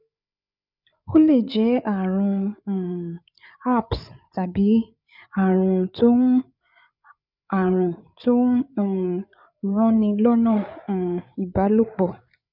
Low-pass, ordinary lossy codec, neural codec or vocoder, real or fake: 5.4 kHz; none; codec, 16 kHz, 8 kbps, FreqCodec, larger model; fake